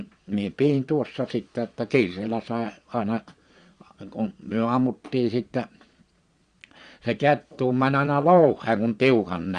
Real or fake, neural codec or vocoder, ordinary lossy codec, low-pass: fake; vocoder, 22.05 kHz, 80 mel bands, Vocos; Opus, 64 kbps; 9.9 kHz